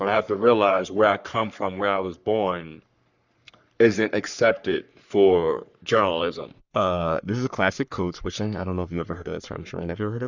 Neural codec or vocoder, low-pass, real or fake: codec, 44.1 kHz, 3.4 kbps, Pupu-Codec; 7.2 kHz; fake